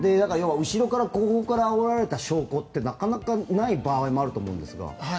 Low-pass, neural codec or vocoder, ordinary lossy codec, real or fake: none; none; none; real